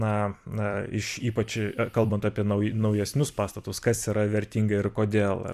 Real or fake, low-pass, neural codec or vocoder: fake; 14.4 kHz; vocoder, 44.1 kHz, 128 mel bands every 256 samples, BigVGAN v2